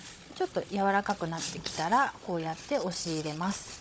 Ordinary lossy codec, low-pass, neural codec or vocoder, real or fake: none; none; codec, 16 kHz, 16 kbps, FunCodec, trained on Chinese and English, 50 frames a second; fake